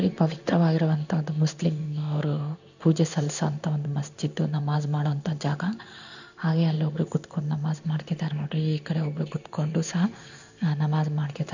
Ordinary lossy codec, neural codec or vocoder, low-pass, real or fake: none; codec, 16 kHz in and 24 kHz out, 1 kbps, XY-Tokenizer; 7.2 kHz; fake